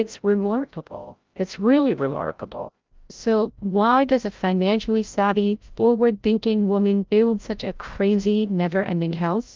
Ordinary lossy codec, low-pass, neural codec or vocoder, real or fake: Opus, 24 kbps; 7.2 kHz; codec, 16 kHz, 0.5 kbps, FreqCodec, larger model; fake